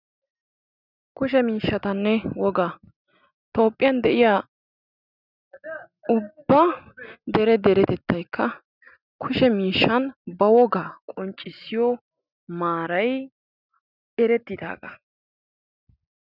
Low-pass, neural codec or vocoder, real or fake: 5.4 kHz; none; real